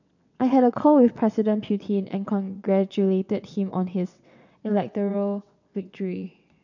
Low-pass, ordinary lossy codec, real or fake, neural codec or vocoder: 7.2 kHz; none; fake; vocoder, 22.05 kHz, 80 mel bands, WaveNeXt